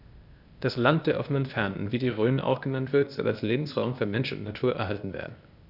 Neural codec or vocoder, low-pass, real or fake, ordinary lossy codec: codec, 16 kHz, 0.8 kbps, ZipCodec; 5.4 kHz; fake; none